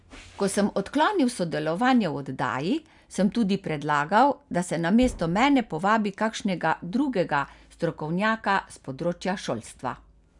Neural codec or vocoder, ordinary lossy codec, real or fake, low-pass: none; none; real; 10.8 kHz